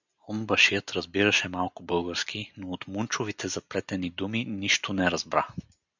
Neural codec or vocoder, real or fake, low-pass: none; real; 7.2 kHz